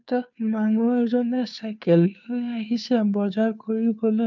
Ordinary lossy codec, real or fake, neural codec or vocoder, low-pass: none; fake; codec, 16 kHz, 2 kbps, FunCodec, trained on Chinese and English, 25 frames a second; 7.2 kHz